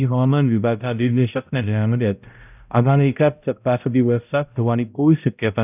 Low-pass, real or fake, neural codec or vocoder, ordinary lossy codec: 3.6 kHz; fake; codec, 16 kHz, 0.5 kbps, X-Codec, HuBERT features, trained on balanced general audio; none